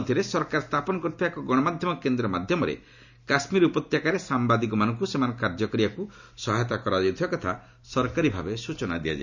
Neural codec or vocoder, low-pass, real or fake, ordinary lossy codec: none; 7.2 kHz; real; none